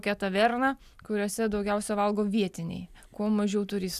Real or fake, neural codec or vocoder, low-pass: real; none; 14.4 kHz